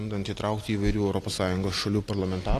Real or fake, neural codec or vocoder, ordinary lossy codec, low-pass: real; none; AAC, 48 kbps; 14.4 kHz